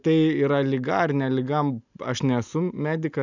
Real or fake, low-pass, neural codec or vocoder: real; 7.2 kHz; none